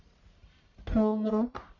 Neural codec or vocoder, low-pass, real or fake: codec, 44.1 kHz, 1.7 kbps, Pupu-Codec; 7.2 kHz; fake